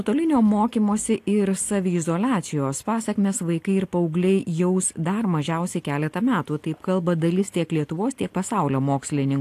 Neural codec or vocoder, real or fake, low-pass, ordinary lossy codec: none; real; 14.4 kHz; AAC, 64 kbps